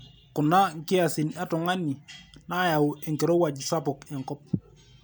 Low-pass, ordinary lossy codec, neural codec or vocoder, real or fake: none; none; none; real